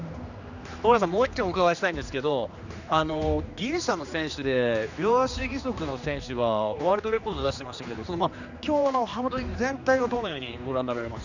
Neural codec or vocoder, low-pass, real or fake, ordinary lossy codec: codec, 16 kHz, 2 kbps, X-Codec, HuBERT features, trained on general audio; 7.2 kHz; fake; none